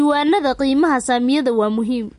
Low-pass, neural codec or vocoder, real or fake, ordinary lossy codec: 14.4 kHz; none; real; MP3, 48 kbps